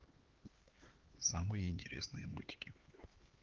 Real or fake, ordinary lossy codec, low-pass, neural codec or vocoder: fake; Opus, 16 kbps; 7.2 kHz; codec, 16 kHz, 4 kbps, X-Codec, HuBERT features, trained on LibriSpeech